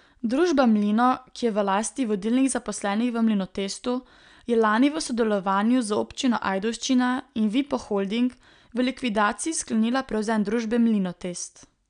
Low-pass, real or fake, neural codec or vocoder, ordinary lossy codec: 9.9 kHz; real; none; none